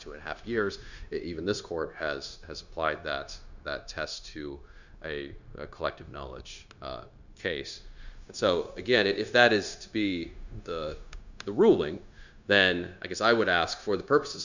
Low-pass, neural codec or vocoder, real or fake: 7.2 kHz; codec, 16 kHz, 0.9 kbps, LongCat-Audio-Codec; fake